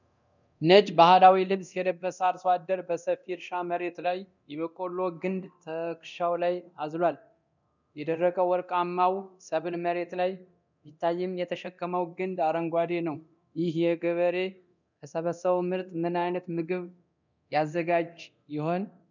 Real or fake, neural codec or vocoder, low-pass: fake; codec, 24 kHz, 0.9 kbps, DualCodec; 7.2 kHz